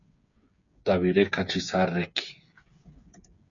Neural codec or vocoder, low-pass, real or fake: codec, 16 kHz, 8 kbps, FreqCodec, smaller model; 7.2 kHz; fake